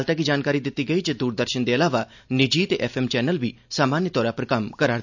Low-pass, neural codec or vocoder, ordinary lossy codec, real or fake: 7.2 kHz; none; none; real